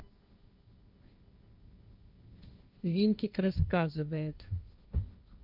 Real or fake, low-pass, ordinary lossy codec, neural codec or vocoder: fake; 5.4 kHz; none; codec, 16 kHz, 1.1 kbps, Voila-Tokenizer